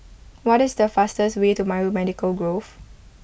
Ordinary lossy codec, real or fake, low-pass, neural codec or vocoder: none; real; none; none